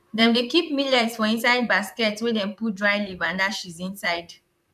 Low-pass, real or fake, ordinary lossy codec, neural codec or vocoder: 14.4 kHz; fake; none; vocoder, 44.1 kHz, 128 mel bands, Pupu-Vocoder